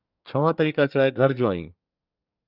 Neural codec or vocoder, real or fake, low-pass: codec, 24 kHz, 1 kbps, SNAC; fake; 5.4 kHz